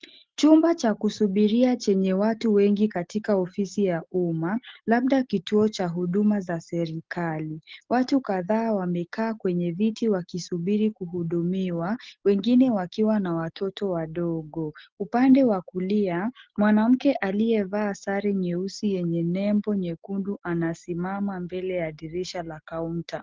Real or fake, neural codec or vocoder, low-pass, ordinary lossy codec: real; none; 7.2 kHz; Opus, 16 kbps